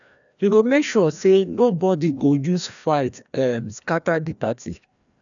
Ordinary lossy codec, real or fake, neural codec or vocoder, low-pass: none; fake; codec, 16 kHz, 1 kbps, FreqCodec, larger model; 7.2 kHz